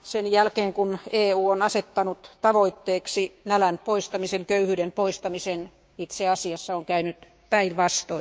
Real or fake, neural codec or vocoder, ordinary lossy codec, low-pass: fake; codec, 16 kHz, 6 kbps, DAC; none; none